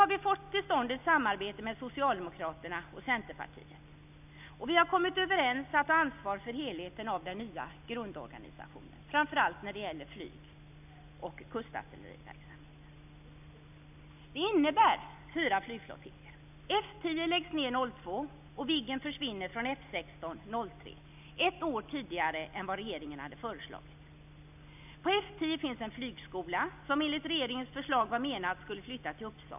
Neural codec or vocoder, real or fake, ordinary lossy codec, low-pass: none; real; none; 3.6 kHz